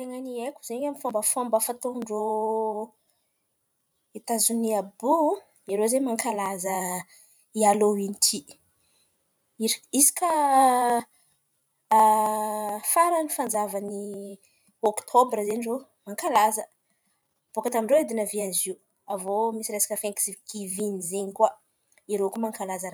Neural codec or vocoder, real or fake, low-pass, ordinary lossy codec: vocoder, 44.1 kHz, 128 mel bands every 512 samples, BigVGAN v2; fake; none; none